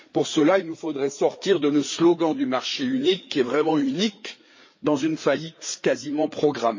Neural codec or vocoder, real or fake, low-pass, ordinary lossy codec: codec, 16 kHz, 4 kbps, FreqCodec, larger model; fake; 7.2 kHz; MP3, 32 kbps